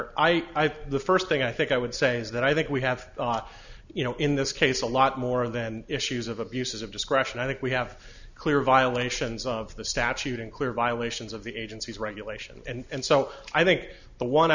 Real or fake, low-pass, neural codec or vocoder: real; 7.2 kHz; none